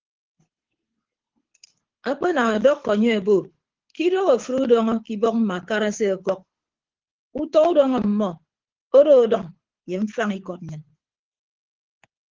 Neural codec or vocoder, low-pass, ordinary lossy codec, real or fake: codec, 24 kHz, 6 kbps, HILCodec; 7.2 kHz; Opus, 16 kbps; fake